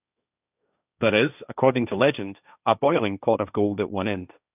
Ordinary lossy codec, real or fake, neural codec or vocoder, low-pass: none; fake; codec, 16 kHz, 1.1 kbps, Voila-Tokenizer; 3.6 kHz